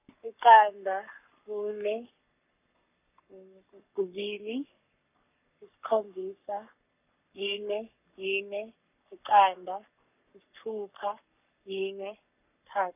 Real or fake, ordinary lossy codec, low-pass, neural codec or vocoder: fake; none; 3.6 kHz; codec, 44.1 kHz, 7.8 kbps, Pupu-Codec